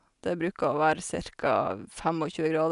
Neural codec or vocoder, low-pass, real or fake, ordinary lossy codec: none; 10.8 kHz; real; none